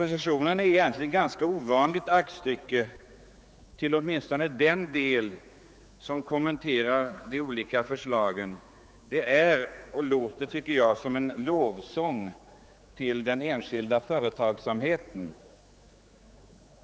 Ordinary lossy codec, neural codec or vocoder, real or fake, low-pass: none; codec, 16 kHz, 4 kbps, X-Codec, HuBERT features, trained on general audio; fake; none